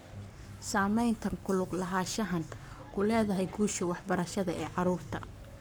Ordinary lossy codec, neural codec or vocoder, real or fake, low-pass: none; codec, 44.1 kHz, 7.8 kbps, Pupu-Codec; fake; none